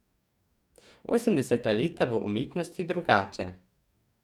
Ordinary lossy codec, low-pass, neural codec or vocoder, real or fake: none; 19.8 kHz; codec, 44.1 kHz, 2.6 kbps, DAC; fake